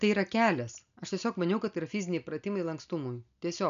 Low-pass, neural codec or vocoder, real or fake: 7.2 kHz; none; real